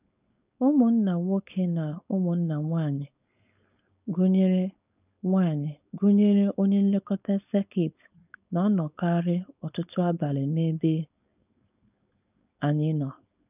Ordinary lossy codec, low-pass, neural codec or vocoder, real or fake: none; 3.6 kHz; codec, 16 kHz, 4.8 kbps, FACodec; fake